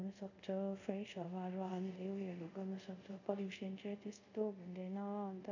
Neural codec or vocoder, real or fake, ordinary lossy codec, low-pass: codec, 24 kHz, 0.5 kbps, DualCodec; fake; none; 7.2 kHz